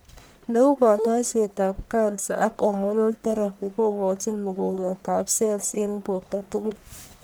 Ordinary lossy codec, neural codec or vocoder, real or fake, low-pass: none; codec, 44.1 kHz, 1.7 kbps, Pupu-Codec; fake; none